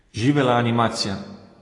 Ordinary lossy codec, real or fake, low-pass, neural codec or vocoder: AAC, 32 kbps; real; 10.8 kHz; none